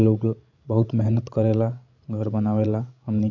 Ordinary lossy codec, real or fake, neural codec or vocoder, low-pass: AAC, 48 kbps; fake; codec, 16 kHz, 16 kbps, FreqCodec, larger model; 7.2 kHz